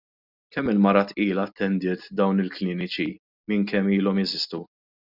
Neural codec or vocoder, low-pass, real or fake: none; 5.4 kHz; real